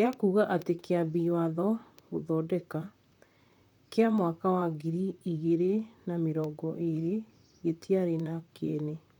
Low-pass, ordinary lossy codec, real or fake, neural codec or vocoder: 19.8 kHz; none; fake; vocoder, 44.1 kHz, 128 mel bands, Pupu-Vocoder